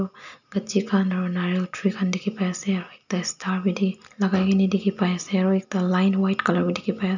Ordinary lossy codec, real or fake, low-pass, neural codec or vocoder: none; real; 7.2 kHz; none